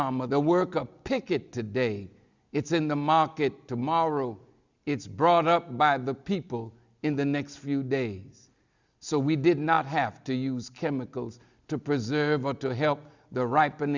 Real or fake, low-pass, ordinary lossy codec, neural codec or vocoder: real; 7.2 kHz; Opus, 64 kbps; none